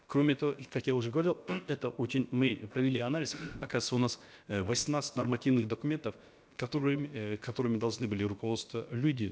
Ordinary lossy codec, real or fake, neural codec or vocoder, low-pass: none; fake; codec, 16 kHz, about 1 kbps, DyCAST, with the encoder's durations; none